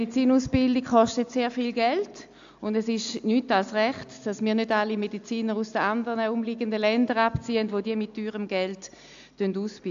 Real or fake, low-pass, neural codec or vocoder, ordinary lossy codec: real; 7.2 kHz; none; AAC, 64 kbps